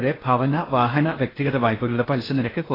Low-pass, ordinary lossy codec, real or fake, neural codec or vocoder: 5.4 kHz; AAC, 24 kbps; fake; codec, 16 kHz in and 24 kHz out, 0.8 kbps, FocalCodec, streaming, 65536 codes